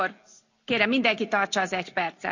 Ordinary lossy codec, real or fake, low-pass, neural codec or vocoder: none; real; 7.2 kHz; none